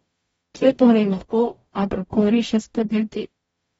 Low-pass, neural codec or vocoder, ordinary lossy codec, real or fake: 19.8 kHz; codec, 44.1 kHz, 0.9 kbps, DAC; AAC, 24 kbps; fake